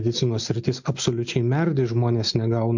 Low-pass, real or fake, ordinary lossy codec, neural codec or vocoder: 7.2 kHz; real; MP3, 64 kbps; none